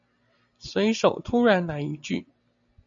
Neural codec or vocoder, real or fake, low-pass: none; real; 7.2 kHz